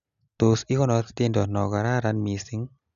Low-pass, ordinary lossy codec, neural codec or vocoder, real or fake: 7.2 kHz; none; none; real